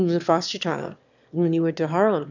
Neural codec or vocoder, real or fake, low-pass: autoencoder, 22.05 kHz, a latent of 192 numbers a frame, VITS, trained on one speaker; fake; 7.2 kHz